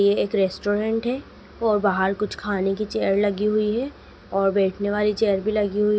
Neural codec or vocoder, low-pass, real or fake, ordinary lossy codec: none; none; real; none